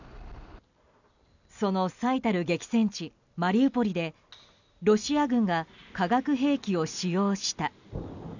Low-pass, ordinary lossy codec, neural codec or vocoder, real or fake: 7.2 kHz; none; none; real